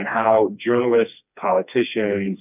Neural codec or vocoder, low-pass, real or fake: codec, 16 kHz, 2 kbps, FreqCodec, smaller model; 3.6 kHz; fake